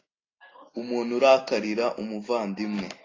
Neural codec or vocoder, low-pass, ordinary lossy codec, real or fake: none; 7.2 kHz; AAC, 32 kbps; real